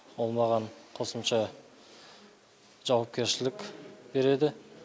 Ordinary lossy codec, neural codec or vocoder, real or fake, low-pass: none; none; real; none